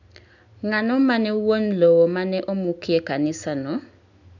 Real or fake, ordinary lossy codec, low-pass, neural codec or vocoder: real; none; 7.2 kHz; none